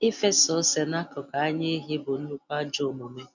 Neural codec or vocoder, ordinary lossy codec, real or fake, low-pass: none; none; real; 7.2 kHz